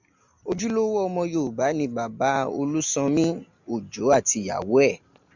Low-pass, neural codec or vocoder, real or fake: 7.2 kHz; none; real